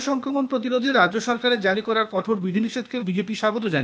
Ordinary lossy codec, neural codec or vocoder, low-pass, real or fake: none; codec, 16 kHz, 0.8 kbps, ZipCodec; none; fake